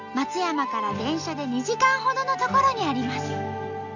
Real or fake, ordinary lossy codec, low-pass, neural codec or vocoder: real; none; 7.2 kHz; none